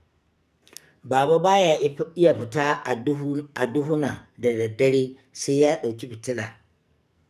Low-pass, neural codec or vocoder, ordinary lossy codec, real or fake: 14.4 kHz; codec, 44.1 kHz, 2.6 kbps, SNAC; none; fake